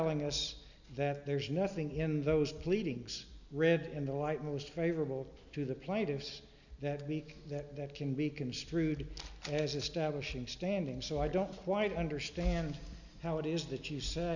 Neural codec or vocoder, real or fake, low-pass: none; real; 7.2 kHz